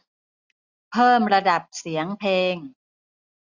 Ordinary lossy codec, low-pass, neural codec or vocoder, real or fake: Opus, 64 kbps; 7.2 kHz; none; real